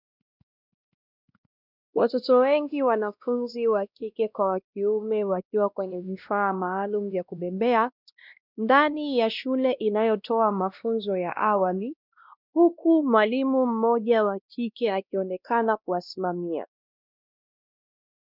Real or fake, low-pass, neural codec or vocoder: fake; 5.4 kHz; codec, 16 kHz, 1 kbps, X-Codec, WavLM features, trained on Multilingual LibriSpeech